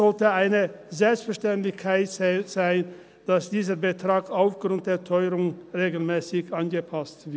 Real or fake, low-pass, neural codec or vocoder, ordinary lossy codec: real; none; none; none